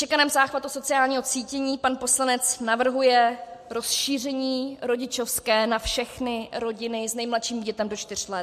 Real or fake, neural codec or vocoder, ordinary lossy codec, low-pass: real; none; MP3, 64 kbps; 14.4 kHz